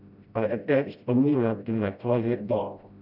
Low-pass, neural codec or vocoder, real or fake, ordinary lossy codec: 5.4 kHz; codec, 16 kHz, 0.5 kbps, FreqCodec, smaller model; fake; none